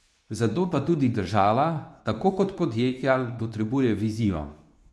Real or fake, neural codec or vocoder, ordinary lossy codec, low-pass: fake; codec, 24 kHz, 0.9 kbps, WavTokenizer, medium speech release version 2; none; none